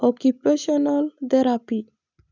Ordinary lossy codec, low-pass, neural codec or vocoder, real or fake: none; 7.2 kHz; none; real